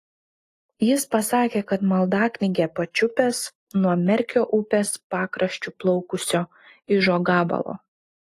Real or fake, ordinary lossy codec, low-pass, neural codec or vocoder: real; AAC, 48 kbps; 14.4 kHz; none